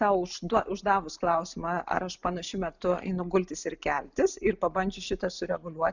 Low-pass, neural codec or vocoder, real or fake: 7.2 kHz; none; real